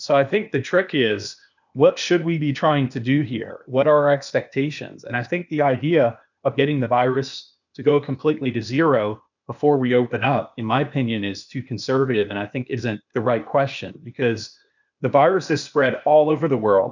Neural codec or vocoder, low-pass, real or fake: codec, 16 kHz, 0.8 kbps, ZipCodec; 7.2 kHz; fake